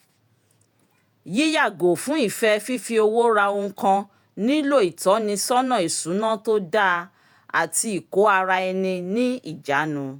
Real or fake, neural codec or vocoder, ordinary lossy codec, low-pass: fake; vocoder, 48 kHz, 128 mel bands, Vocos; none; none